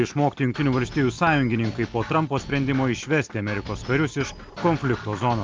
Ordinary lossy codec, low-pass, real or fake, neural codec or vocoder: Opus, 24 kbps; 7.2 kHz; real; none